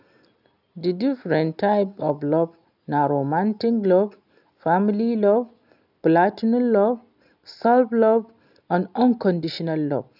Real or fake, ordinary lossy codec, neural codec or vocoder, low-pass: real; none; none; 5.4 kHz